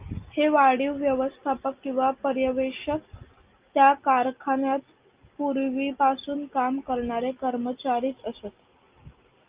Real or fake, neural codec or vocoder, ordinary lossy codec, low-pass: real; none; Opus, 24 kbps; 3.6 kHz